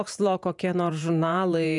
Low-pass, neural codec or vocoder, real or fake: 10.8 kHz; vocoder, 48 kHz, 128 mel bands, Vocos; fake